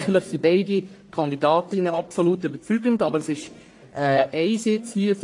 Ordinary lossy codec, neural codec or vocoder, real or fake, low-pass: AAC, 48 kbps; codec, 44.1 kHz, 1.7 kbps, Pupu-Codec; fake; 10.8 kHz